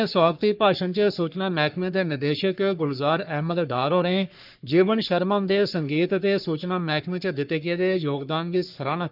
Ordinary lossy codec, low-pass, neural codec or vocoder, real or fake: none; 5.4 kHz; codec, 44.1 kHz, 3.4 kbps, Pupu-Codec; fake